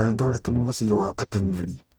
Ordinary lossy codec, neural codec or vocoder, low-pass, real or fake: none; codec, 44.1 kHz, 0.9 kbps, DAC; none; fake